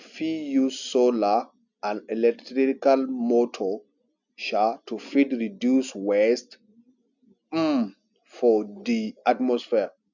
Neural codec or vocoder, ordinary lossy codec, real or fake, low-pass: none; none; real; 7.2 kHz